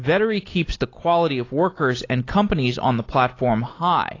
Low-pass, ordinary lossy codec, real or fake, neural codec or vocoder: 7.2 kHz; AAC, 32 kbps; real; none